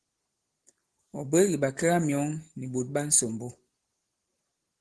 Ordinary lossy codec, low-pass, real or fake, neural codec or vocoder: Opus, 16 kbps; 10.8 kHz; real; none